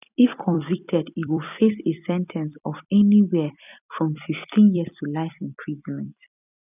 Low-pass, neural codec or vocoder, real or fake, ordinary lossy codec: 3.6 kHz; vocoder, 44.1 kHz, 128 mel bands every 256 samples, BigVGAN v2; fake; none